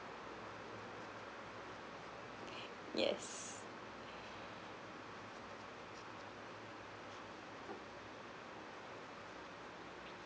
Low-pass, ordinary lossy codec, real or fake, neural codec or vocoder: none; none; real; none